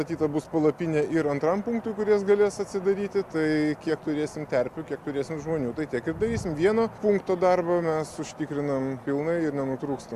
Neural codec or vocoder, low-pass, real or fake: none; 14.4 kHz; real